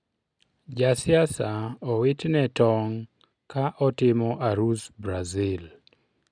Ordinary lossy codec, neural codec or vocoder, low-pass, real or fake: none; none; 9.9 kHz; real